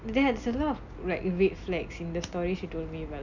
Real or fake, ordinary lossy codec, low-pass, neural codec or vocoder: real; none; 7.2 kHz; none